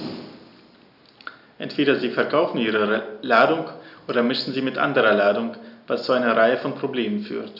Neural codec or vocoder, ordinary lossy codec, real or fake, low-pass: none; none; real; 5.4 kHz